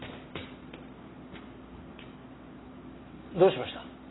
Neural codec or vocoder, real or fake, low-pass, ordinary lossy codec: none; real; 7.2 kHz; AAC, 16 kbps